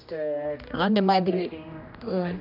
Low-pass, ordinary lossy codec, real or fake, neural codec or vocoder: 5.4 kHz; AAC, 48 kbps; fake; codec, 16 kHz, 1 kbps, X-Codec, HuBERT features, trained on general audio